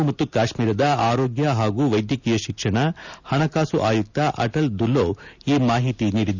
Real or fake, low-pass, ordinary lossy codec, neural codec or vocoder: real; 7.2 kHz; none; none